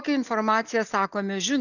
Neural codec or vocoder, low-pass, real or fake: none; 7.2 kHz; real